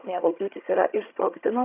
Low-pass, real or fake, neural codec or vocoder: 3.6 kHz; fake; vocoder, 22.05 kHz, 80 mel bands, HiFi-GAN